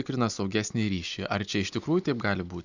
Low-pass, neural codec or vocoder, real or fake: 7.2 kHz; none; real